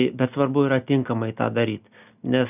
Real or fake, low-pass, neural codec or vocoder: real; 3.6 kHz; none